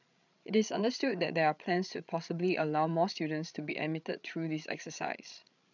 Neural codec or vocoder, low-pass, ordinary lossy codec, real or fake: codec, 16 kHz, 16 kbps, FreqCodec, larger model; 7.2 kHz; none; fake